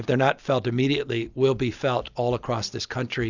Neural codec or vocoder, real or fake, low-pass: none; real; 7.2 kHz